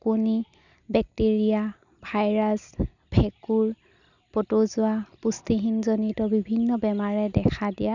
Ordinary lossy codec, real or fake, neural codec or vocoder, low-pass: none; real; none; 7.2 kHz